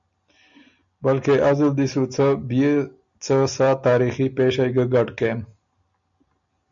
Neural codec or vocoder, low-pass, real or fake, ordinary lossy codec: none; 7.2 kHz; real; MP3, 96 kbps